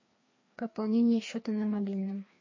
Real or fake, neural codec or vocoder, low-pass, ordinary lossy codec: fake; codec, 16 kHz, 2 kbps, FreqCodec, larger model; 7.2 kHz; MP3, 32 kbps